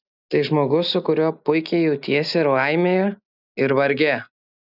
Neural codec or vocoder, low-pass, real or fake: none; 5.4 kHz; real